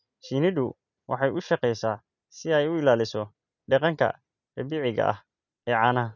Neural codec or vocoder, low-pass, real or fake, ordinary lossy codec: none; 7.2 kHz; real; none